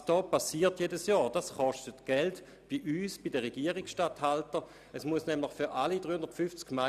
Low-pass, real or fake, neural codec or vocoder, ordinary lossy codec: 14.4 kHz; real; none; none